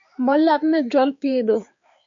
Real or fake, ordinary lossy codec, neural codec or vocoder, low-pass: fake; MP3, 64 kbps; codec, 16 kHz, 4 kbps, X-Codec, HuBERT features, trained on balanced general audio; 7.2 kHz